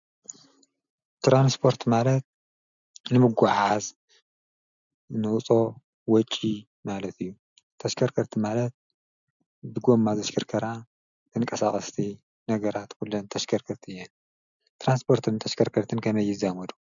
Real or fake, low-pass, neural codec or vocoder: real; 7.2 kHz; none